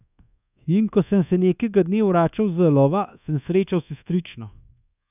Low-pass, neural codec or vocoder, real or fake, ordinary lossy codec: 3.6 kHz; codec, 24 kHz, 1.2 kbps, DualCodec; fake; none